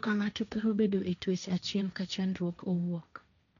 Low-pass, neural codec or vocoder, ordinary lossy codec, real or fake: 7.2 kHz; codec, 16 kHz, 1.1 kbps, Voila-Tokenizer; none; fake